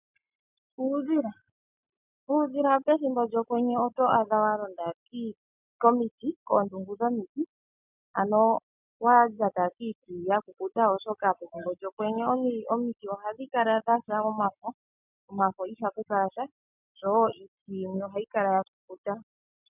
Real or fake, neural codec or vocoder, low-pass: real; none; 3.6 kHz